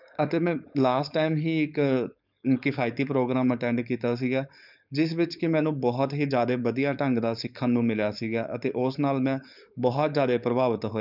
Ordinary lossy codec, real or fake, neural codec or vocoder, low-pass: none; fake; codec, 16 kHz, 4.8 kbps, FACodec; 5.4 kHz